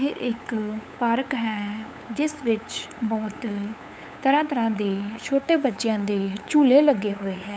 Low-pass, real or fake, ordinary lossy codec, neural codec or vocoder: none; fake; none; codec, 16 kHz, 8 kbps, FunCodec, trained on LibriTTS, 25 frames a second